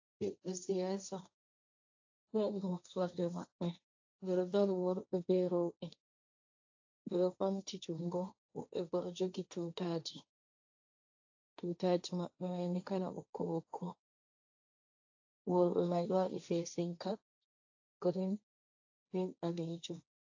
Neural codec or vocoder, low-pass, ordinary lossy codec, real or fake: codec, 16 kHz, 1.1 kbps, Voila-Tokenizer; 7.2 kHz; AAC, 48 kbps; fake